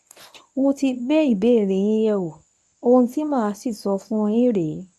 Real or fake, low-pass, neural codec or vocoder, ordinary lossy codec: fake; none; codec, 24 kHz, 0.9 kbps, WavTokenizer, medium speech release version 1; none